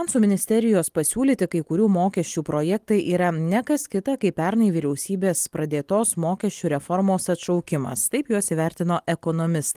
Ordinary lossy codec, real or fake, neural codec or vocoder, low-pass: Opus, 24 kbps; real; none; 14.4 kHz